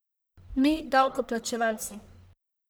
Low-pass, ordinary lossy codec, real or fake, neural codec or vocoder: none; none; fake; codec, 44.1 kHz, 1.7 kbps, Pupu-Codec